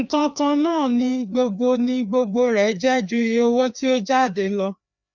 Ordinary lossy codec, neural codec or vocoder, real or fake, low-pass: none; codec, 16 kHz, 2 kbps, FreqCodec, larger model; fake; 7.2 kHz